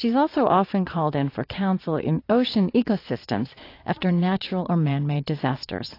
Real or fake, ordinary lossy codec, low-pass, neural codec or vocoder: fake; AAC, 32 kbps; 5.4 kHz; vocoder, 22.05 kHz, 80 mel bands, Vocos